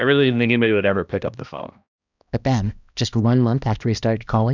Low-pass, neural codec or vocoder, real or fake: 7.2 kHz; codec, 16 kHz, 1 kbps, X-Codec, HuBERT features, trained on balanced general audio; fake